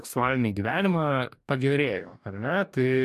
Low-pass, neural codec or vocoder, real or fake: 14.4 kHz; codec, 44.1 kHz, 2.6 kbps, DAC; fake